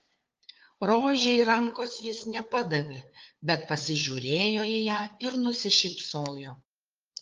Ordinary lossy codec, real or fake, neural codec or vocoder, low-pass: Opus, 24 kbps; fake; codec, 16 kHz, 2 kbps, FunCodec, trained on LibriTTS, 25 frames a second; 7.2 kHz